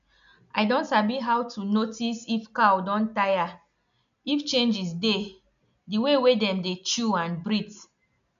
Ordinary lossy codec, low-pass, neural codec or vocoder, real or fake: none; 7.2 kHz; none; real